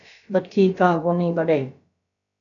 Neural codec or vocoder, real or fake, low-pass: codec, 16 kHz, about 1 kbps, DyCAST, with the encoder's durations; fake; 7.2 kHz